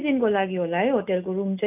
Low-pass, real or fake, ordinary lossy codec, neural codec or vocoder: 3.6 kHz; real; none; none